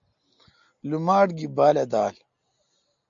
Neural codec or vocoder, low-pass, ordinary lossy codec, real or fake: none; 7.2 kHz; AAC, 64 kbps; real